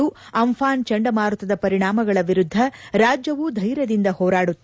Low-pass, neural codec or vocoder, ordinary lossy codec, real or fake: none; none; none; real